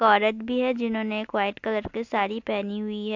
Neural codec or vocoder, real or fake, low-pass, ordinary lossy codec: none; real; 7.2 kHz; AAC, 48 kbps